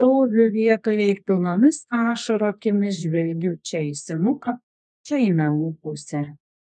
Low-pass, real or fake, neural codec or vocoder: 10.8 kHz; fake; codec, 24 kHz, 0.9 kbps, WavTokenizer, medium music audio release